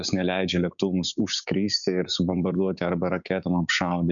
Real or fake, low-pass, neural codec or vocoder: fake; 7.2 kHz; codec, 16 kHz, 6 kbps, DAC